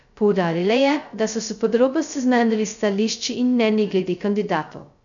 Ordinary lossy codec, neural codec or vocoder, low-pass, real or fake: none; codec, 16 kHz, 0.2 kbps, FocalCodec; 7.2 kHz; fake